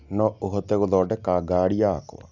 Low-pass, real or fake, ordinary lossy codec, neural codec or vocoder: 7.2 kHz; real; none; none